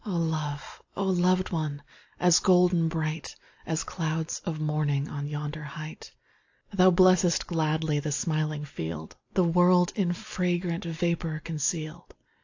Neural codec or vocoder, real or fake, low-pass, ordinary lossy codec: none; real; 7.2 kHz; AAC, 48 kbps